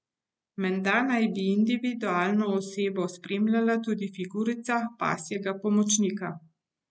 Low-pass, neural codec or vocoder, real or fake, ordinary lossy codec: none; none; real; none